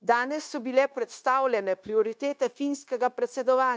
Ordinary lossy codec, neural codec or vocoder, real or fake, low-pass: none; codec, 16 kHz, 0.9 kbps, LongCat-Audio-Codec; fake; none